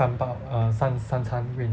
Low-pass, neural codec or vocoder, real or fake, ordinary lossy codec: none; none; real; none